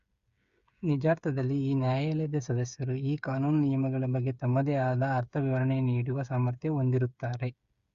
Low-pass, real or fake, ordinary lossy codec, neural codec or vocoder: 7.2 kHz; fake; Opus, 64 kbps; codec, 16 kHz, 8 kbps, FreqCodec, smaller model